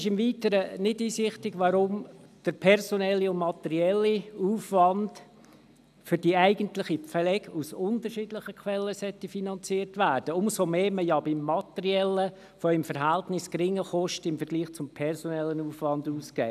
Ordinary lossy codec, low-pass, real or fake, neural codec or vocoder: none; 14.4 kHz; real; none